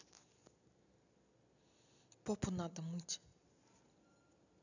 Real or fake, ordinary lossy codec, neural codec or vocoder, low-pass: real; none; none; 7.2 kHz